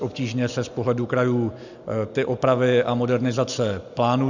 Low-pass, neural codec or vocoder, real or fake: 7.2 kHz; none; real